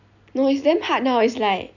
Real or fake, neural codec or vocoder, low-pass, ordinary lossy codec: real; none; 7.2 kHz; none